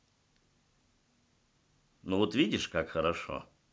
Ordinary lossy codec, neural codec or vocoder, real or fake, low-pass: none; none; real; none